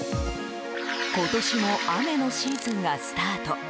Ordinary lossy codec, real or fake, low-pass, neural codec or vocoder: none; real; none; none